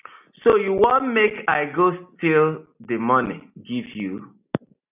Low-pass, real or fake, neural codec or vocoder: 3.6 kHz; real; none